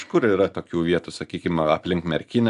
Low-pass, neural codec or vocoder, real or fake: 10.8 kHz; none; real